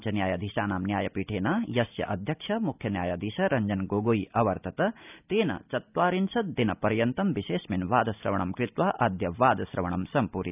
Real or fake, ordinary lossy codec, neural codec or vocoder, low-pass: real; none; none; 3.6 kHz